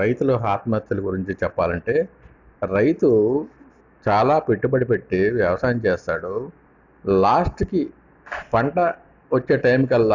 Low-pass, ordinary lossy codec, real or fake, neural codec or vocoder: 7.2 kHz; none; real; none